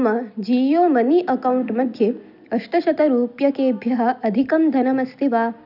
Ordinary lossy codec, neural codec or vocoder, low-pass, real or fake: none; none; 5.4 kHz; real